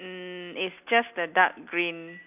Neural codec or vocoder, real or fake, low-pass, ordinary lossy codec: none; real; 3.6 kHz; none